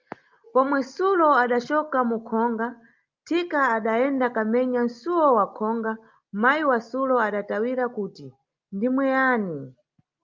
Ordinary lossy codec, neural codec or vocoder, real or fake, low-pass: Opus, 24 kbps; none; real; 7.2 kHz